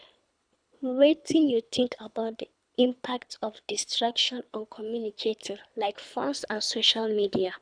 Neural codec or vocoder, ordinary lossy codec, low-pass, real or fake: codec, 24 kHz, 3 kbps, HILCodec; none; 9.9 kHz; fake